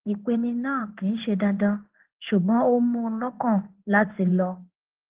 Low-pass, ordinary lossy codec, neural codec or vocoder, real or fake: 3.6 kHz; Opus, 16 kbps; codec, 16 kHz in and 24 kHz out, 1 kbps, XY-Tokenizer; fake